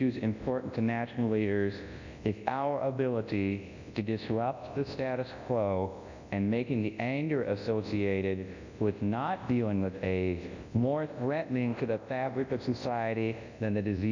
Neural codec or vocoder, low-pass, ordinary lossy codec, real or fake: codec, 24 kHz, 0.9 kbps, WavTokenizer, large speech release; 7.2 kHz; MP3, 64 kbps; fake